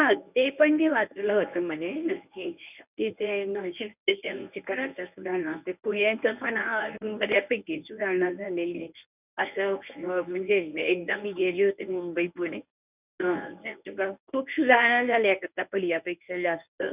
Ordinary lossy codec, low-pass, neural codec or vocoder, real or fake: none; 3.6 kHz; codec, 24 kHz, 0.9 kbps, WavTokenizer, medium speech release version 1; fake